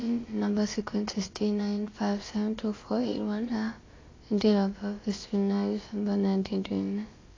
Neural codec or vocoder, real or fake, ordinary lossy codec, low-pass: codec, 16 kHz, about 1 kbps, DyCAST, with the encoder's durations; fake; AAC, 32 kbps; 7.2 kHz